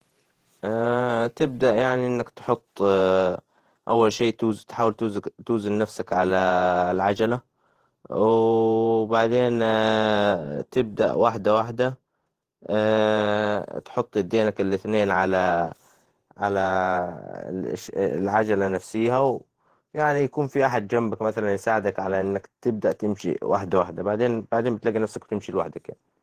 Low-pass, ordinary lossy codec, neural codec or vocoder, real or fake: 14.4 kHz; Opus, 16 kbps; vocoder, 48 kHz, 128 mel bands, Vocos; fake